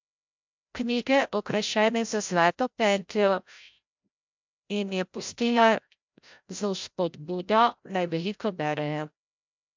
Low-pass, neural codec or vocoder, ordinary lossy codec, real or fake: 7.2 kHz; codec, 16 kHz, 0.5 kbps, FreqCodec, larger model; MP3, 64 kbps; fake